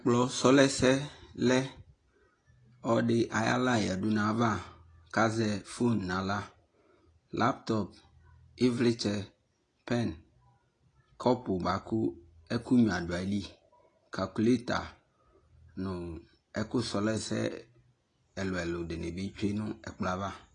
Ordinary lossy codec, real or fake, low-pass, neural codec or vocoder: AAC, 32 kbps; real; 9.9 kHz; none